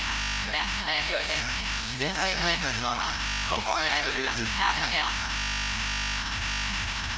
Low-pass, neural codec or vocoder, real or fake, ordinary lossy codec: none; codec, 16 kHz, 0.5 kbps, FreqCodec, larger model; fake; none